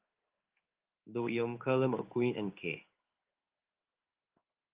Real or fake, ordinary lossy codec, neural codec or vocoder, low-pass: fake; Opus, 32 kbps; codec, 16 kHz in and 24 kHz out, 1 kbps, XY-Tokenizer; 3.6 kHz